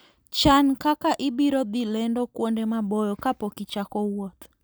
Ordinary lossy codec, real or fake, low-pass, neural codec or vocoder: none; real; none; none